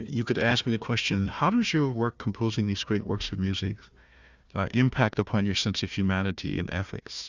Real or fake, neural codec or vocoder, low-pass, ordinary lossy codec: fake; codec, 16 kHz, 1 kbps, FunCodec, trained on Chinese and English, 50 frames a second; 7.2 kHz; Opus, 64 kbps